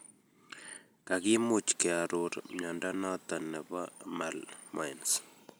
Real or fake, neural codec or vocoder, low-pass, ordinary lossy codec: real; none; none; none